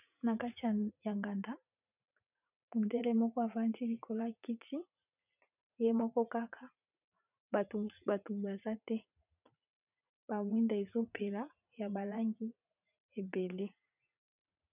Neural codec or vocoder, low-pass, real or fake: vocoder, 44.1 kHz, 80 mel bands, Vocos; 3.6 kHz; fake